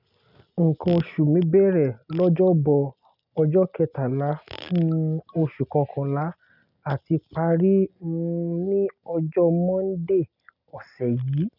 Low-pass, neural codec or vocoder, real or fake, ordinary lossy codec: 5.4 kHz; none; real; none